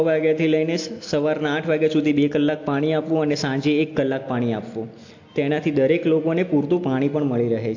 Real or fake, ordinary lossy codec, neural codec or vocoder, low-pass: real; AAC, 48 kbps; none; 7.2 kHz